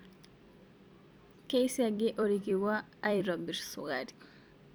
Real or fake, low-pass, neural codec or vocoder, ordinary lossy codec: fake; none; vocoder, 44.1 kHz, 128 mel bands every 256 samples, BigVGAN v2; none